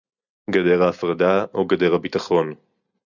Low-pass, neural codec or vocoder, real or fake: 7.2 kHz; none; real